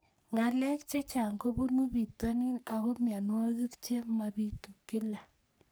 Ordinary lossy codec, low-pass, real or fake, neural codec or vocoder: none; none; fake; codec, 44.1 kHz, 3.4 kbps, Pupu-Codec